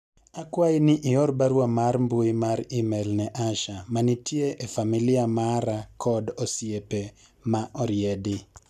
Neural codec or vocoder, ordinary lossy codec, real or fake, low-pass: none; none; real; 14.4 kHz